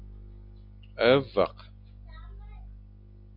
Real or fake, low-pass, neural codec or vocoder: real; 5.4 kHz; none